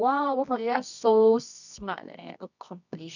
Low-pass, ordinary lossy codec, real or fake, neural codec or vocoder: 7.2 kHz; none; fake; codec, 24 kHz, 0.9 kbps, WavTokenizer, medium music audio release